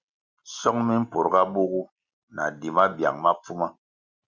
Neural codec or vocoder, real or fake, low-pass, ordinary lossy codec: none; real; 7.2 kHz; Opus, 64 kbps